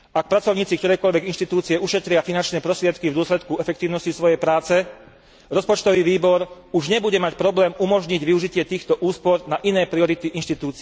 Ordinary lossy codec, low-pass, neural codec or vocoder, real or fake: none; none; none; real